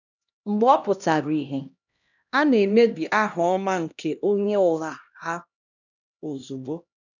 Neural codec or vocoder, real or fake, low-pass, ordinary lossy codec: codec, 16 kHz, 1 kbps, X-Codec, HuBERT features, trained on LibriSpeech; fake; 7.2 kHz; none